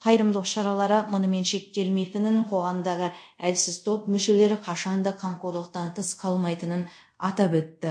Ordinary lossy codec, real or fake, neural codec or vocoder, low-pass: MP3, 48 kbps; fake; codec, 24 kHz, 0.5 kbps, DualCodec; 9.9 kHz